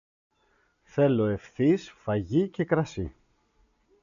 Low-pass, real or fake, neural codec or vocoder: 7.2 kHz; real; none